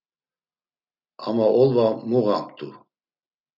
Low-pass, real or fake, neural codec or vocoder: 5.4 kHz; real; none